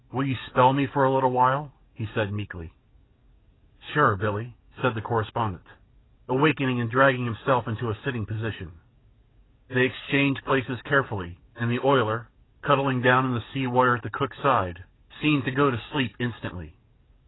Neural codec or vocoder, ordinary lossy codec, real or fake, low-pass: codec, 44.1 kHz, 7.8 kbps, DAC; AAC, 16 kbps; fake; 7.2 kHz